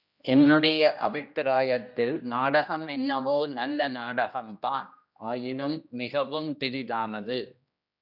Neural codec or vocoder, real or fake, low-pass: codec, 16 kHz, 1 kbps, X-Codec, HuBERT features, trained on general audio; fake; 5.4 kHz